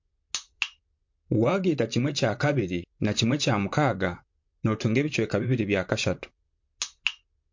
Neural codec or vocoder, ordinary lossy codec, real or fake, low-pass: vocoder, 44.1 kHz, 128 mel bands, Pupu-Vocoder; MP3, 48 kbps; fake; 7.2 kHz